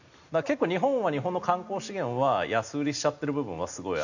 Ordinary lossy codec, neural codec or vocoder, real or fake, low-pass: none; none; real; 7.2 kHz